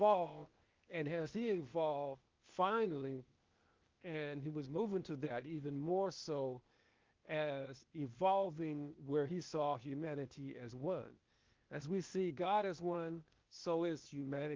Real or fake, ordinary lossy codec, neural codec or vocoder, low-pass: fake; Opus, 24 kbps; codec, 16 kHz, 0.8 kbps, ZipCodec; 7.2 kHz